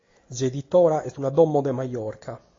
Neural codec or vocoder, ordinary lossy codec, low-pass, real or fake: none; AAC, 32 kbps; 7.2 kHz; real